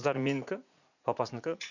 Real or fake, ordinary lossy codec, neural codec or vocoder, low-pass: fake; none; vocoder, 44.1 kHz, 80 mel bands, Vocos; 7.2 kHz